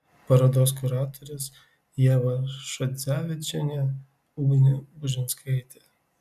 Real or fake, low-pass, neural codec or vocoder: real; 14.4 kHz; none